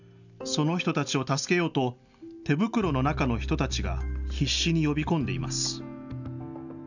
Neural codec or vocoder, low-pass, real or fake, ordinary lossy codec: none; 7.2 kHz; real; none